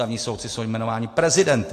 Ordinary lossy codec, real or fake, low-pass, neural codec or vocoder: AAC, 48 kbps; real; 14.4 kHz; none